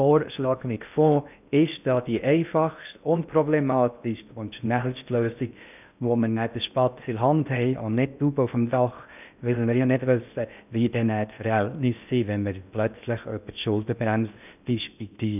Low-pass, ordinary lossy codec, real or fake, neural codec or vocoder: 3.6 kHz; none; fake; codec, 16 kHz in and 24 kHz out, 0.6 kbps, FocalCodec, streaming, 2048 codes